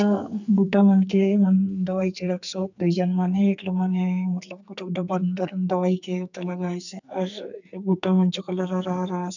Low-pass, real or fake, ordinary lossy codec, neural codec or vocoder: 7.2 kHz; fake; none; codec, 44.1 kHz, 2.6 kbps, SNAC